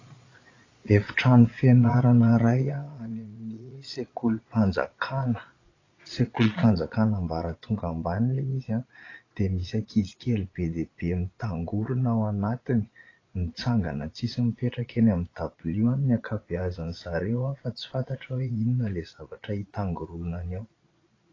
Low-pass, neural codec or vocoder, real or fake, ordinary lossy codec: 7.2 kHz; vocoder, 22.05 kHz, 80 mel bands, Vocos; fake; AAC, 32 kbps